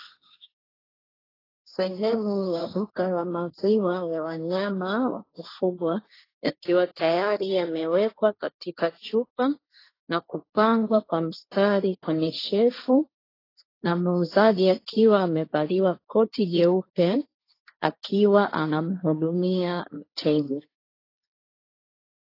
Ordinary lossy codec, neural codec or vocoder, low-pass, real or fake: AAC, 24 kbps; codec, 16 kHz, 1.1 kbps, Voila-Tokenizer; 5.4 kHz; fake